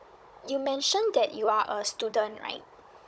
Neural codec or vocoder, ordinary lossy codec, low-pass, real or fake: codec, 16 kHz, 16 kbps, FunCodec, trained on Chinese and English, 50 frames a second; none; none; fake